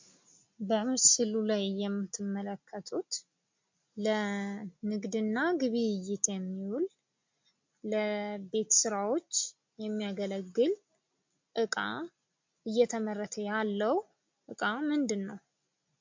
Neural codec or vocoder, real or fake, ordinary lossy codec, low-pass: none; real; MP3, 48 kbps; 7.2 kHz